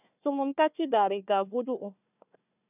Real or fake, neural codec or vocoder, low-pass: fake; codec, 16 kHz, 1 kbps, FunCodec, trained on Chinese and English, 50 frames a second; 3.6 kHz